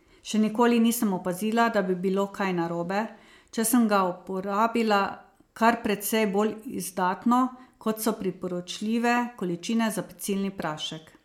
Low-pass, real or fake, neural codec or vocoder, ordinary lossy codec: 19.8 kHz; real; none; MP3, 96 kbps